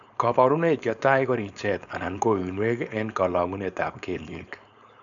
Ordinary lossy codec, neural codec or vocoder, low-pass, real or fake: none; codec, 16 kHz, 4.8 kbps, FACodec; 7.2 kHz; fake